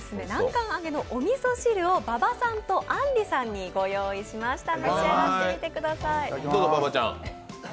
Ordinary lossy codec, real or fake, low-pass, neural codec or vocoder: none; real; none; none